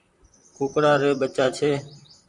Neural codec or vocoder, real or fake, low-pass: vocoder, 44.1 kHz, 128 mel bands, Pupu-Vocoder; fake; 10.8 kHz